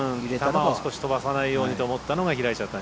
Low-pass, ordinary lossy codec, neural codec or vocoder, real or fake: none; none; none; real